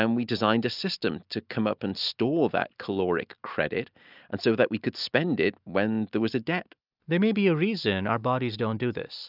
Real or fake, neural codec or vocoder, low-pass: real; none; 5.4 kHz